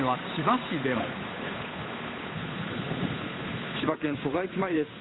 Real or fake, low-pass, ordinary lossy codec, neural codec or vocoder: fake; 7.2 kHz; AAC, 16 kbps; codec, 16 kHz, 8 kbps, FunCodec, trained on Chinese and English, 25 frames a second